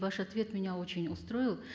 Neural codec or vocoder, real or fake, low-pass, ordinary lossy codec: none; real; none; none